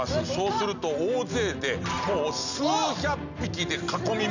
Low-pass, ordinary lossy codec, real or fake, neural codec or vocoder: 7.2 kHz; none; real; none